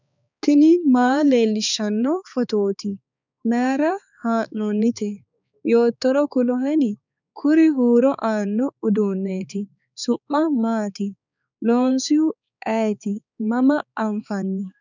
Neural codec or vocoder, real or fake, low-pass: codec, 16 kHz, 4 kbps, X-Codec, HuBERT features, trained on balanced general audio; fake; 7.2 kHz